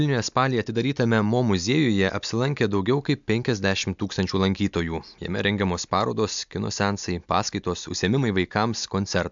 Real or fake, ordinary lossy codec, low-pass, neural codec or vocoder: real; MP3, 64 kbps; 7.2 kHz; none